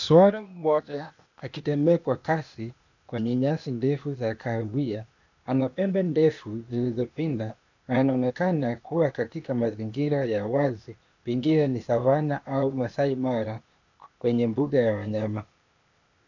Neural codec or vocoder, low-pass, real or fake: codec, 16 kHz, 0.8 kbps, ZipCodec; 7.2 kHz; fake